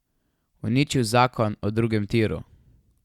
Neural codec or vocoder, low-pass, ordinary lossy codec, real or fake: none; 19.8 kHz; Opus, 64 kbps; real